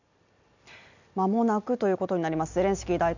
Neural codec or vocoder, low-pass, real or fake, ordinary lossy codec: none; 7.2 kHz; real; none